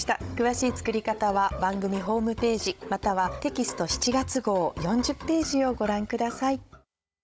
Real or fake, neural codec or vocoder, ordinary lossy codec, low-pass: fake; codec, 16 kHz, 16 kbps, FunCodec, trained on Chinese and English, 50 frames a second; none; none